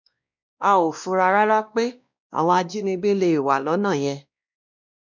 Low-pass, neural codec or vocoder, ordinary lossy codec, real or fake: 7.2 kHz; codec, 16 kHz, 1 kbps, X-Codec, WavLM features, trained on Multilingual LibriSpeech; none; fake